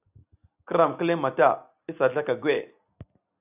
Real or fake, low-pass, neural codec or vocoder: real; 3.6 kHz; none